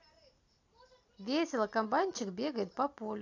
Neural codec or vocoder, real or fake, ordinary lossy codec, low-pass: none; real; AAC, 48 kbps; 7.2 kHz